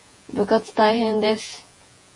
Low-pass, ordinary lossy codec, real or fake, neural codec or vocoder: 10.8 kHz; MP3, 48 kbps; fake; vocoder, 48 kHz, 128 mel bands, Vocos